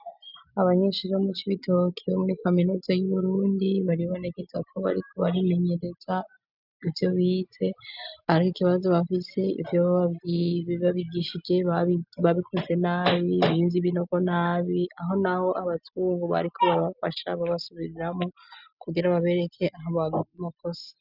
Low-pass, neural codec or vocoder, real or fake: 5.4 kHz; none; real